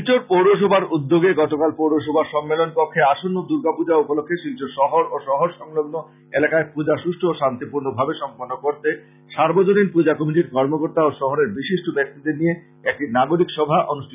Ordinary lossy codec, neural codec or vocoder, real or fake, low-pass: none; none; real; 3.6 kHz